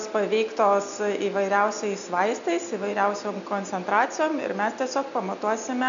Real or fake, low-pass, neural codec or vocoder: real; 7.2 kHz; none